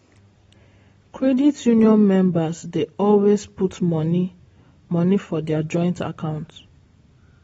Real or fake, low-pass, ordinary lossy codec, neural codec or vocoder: real; 19.8 kHz; AAC, 24 kbps; none